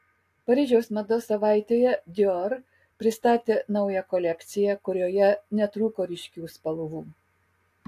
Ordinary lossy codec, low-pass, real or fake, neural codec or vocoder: AAC, 64 kbps; 14.4 kHz; real; none